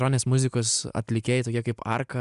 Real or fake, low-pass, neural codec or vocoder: real; 10.8 kHz; none